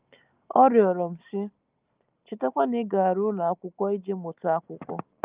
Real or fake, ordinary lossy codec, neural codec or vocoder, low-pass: real; Opus, 24 kbps; none; 3.6 kHz